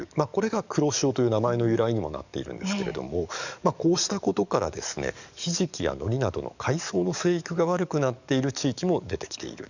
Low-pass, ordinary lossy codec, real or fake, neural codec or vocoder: 7.2 kHz; none; fake; codec, 44.1 kHz, 7.8 kbps, DAC